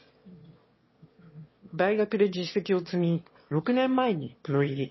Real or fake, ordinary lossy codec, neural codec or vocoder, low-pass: fake; MP3, 24 kbps; autoencoder, 22.05 kHz, a latent of 192 numbers a frame, VITS, trained on one speaker; 7.2 kHz